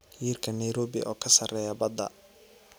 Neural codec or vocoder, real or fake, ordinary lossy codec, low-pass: none; real; none; none